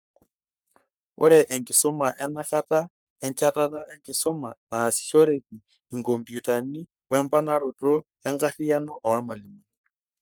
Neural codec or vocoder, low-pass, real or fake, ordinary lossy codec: codec, 44.1 kHz, 3.4 kbps, Pupu-Codec; none; fake; none